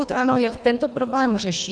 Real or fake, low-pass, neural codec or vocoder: fake; 9.9 kHz; codec, 24 kHz, 1.5 kbps, HILCodec